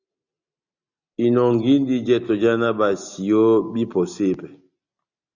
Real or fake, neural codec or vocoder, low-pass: real; none; 7.2 kHz